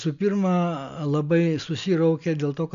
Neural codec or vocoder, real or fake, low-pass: none; real; 7.2 kHz